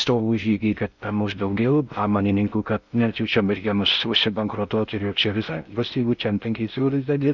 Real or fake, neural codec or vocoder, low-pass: fake; codec, 16 kHz in and 24 kHz out, 0.6 kbps, FocalCodec, streaming, 4096 codes; 7.2 kHz